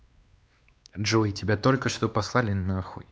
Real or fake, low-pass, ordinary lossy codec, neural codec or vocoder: fake; none; none; codec, 16 kHz, 2 kbps, X-Codec, WavLM features, trained on Multilingual LibriSpeech